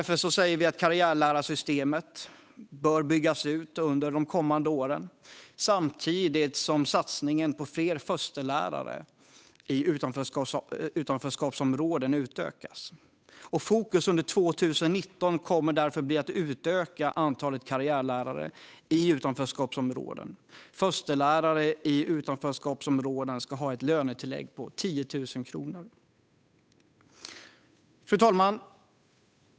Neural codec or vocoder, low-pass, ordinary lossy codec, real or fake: codec, 16 kHz, 8 kbps, FunCodec, trained on Chinese and English, 25 frames a second; none; none; fake